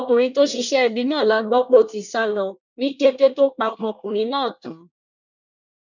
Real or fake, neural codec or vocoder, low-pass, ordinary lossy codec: fake; codec, 24 kHz, 1 kbps, SNAC; 7.2 kHz; none